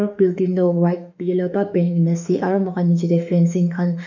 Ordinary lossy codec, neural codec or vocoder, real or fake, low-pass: none; autoencoder, 48 kHz, 32 numbers a frame, DAC-VAE, trained on Japanese speech; fake; 7.2 kHz